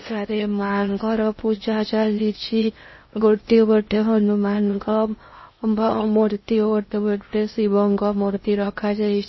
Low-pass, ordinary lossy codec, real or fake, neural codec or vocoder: 7.2 kHz; MP3, 24 kbps; fake; codec, 16 kHz in and 24 kHz out, 0.6 kbps, FocalCodec, streaming, 2048 codes